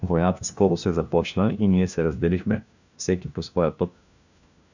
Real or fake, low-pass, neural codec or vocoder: fake; 7.2 kHz; codec, 16 kHz, 1 kbps, FunCodec, trained on LibriTTS, 50 frames a second